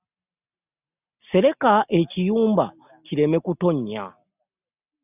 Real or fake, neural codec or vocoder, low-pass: real; none; 3.6 kHz